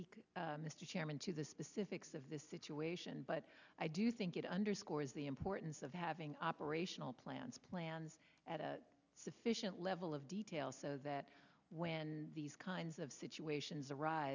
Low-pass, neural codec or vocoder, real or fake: 7.2 kHz; none; real